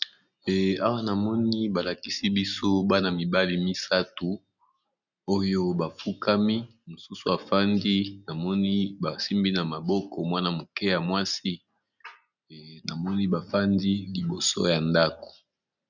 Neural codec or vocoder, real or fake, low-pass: none; real; 7.2 kHz